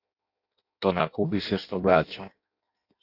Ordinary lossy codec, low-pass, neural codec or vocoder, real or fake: AAC, 48 kbps; 5.4 kHz; codec, 16 kHz in and 24 kHz out, 0.6 kbps, FireRedTTS-2 codec; fake